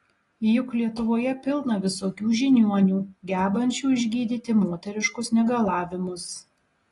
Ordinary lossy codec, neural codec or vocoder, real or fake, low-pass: AAC, 32 kbps; none; real; 10.8 kHz